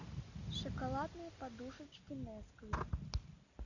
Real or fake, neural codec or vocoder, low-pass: real; none; 7.2 kHz